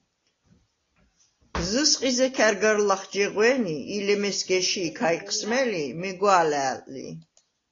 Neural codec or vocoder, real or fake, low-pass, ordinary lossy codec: none; real; 7.2 kHz; AAC, 32 kbps